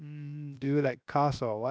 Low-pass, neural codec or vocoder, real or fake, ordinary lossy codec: none; codec, 16 kHz, 0.7 kbps, FocalCodec; fake; none